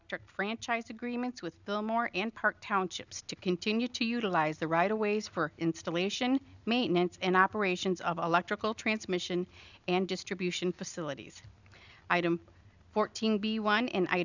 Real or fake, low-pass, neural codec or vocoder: real; 7.2 kHz; none